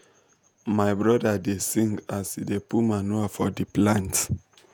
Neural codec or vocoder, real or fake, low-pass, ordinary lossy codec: vocoder, 48 kHz, 128 mel bands, Vocos; fake; none; none